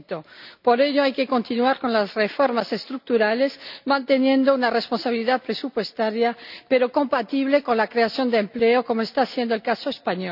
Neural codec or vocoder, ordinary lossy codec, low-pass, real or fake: none; none; 5.4 kHz; real